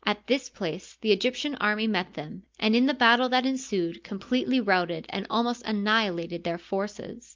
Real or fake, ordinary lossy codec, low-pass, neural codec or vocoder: real; Opus, 24 kbps; 7.2 kHz; none